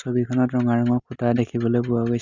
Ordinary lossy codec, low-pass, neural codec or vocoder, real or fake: Opus, 64 kbps; 7.2 kHz; none; real